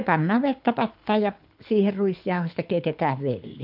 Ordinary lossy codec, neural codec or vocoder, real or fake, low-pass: none; codec, 24 kHz, 6 kbps, HILCodec; fake; 5.4 kHz